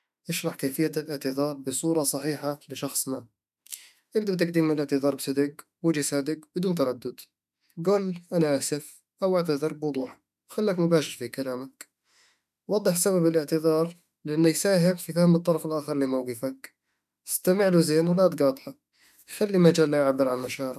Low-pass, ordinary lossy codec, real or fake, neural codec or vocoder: 14.4 kHz; none; fake; autoencoder, 48 kHz, 32 numbers a frame, DAC-VAE, trained on Japanese speech